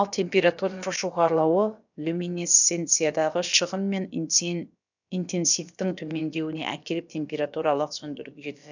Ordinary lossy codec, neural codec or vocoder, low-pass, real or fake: none; codec, 16 kHz, about 1 kbps, DyCAST, with the encoder's durations; 7.2 kHz; fake